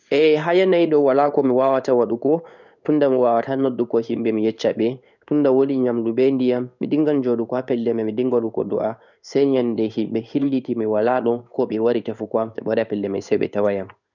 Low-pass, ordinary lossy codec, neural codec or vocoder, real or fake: 7.2 kHz; none; codec, 16 kHz in and 24 kHz out, 1 kbps, XY-Tokenizer; fake